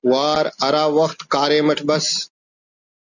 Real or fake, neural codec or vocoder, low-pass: real; none; 7.2 kHz